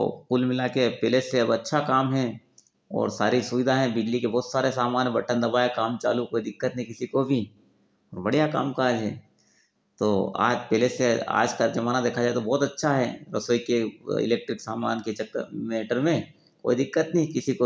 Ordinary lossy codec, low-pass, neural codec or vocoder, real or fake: none; none; none; real